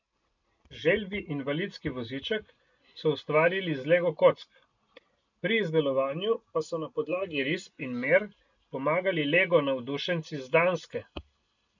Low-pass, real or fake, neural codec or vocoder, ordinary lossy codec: 7.2 kHz; real; none; none